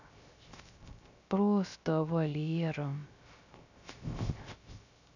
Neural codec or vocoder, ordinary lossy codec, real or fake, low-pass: codec, 16 kHz, 0.3 kbps, FocalCodec; none; fake; 7.2 kHz